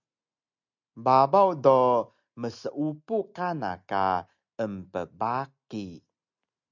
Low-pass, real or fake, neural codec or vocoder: 7.2 kHz; real; none